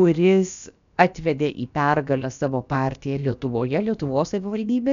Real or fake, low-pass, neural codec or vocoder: fake; 7.2 kHz; codec, 16 kHz, about 1 kbps, DyCAST, with the encoder's durations